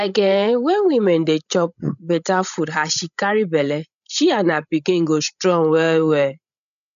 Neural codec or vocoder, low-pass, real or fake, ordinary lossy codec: codec, 16 kHz, 16 kbps, FreqCodec, larger model; 7.2 kHz; fake; none